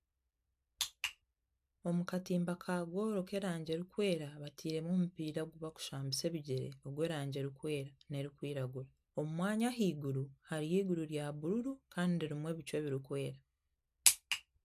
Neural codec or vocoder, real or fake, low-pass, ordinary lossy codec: none; real; 14.4 kHz; AAC, 96 kbps